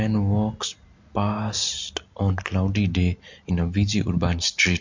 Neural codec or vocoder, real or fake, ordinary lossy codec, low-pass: none; real; MP3, 48 kbps; 7.2 kHz